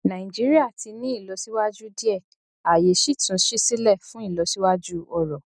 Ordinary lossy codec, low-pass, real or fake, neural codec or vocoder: none; 9.9 kHz; real; none